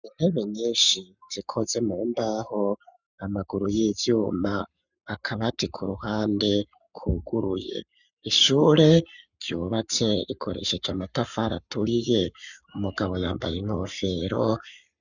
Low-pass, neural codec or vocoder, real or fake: 7.2 kHz; codec, 44.1 kHz, 7.8 kbps, Pupu-Codec; fake